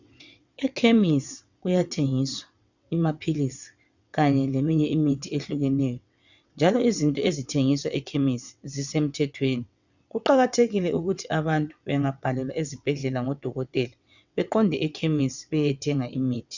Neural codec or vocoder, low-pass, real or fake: vocoder, 22.05 kHz, 80 mel bands, WaveNeXt; 7.2 kHz; fake